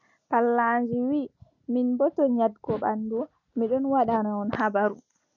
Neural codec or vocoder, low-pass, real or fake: none; 7.2 kHz; real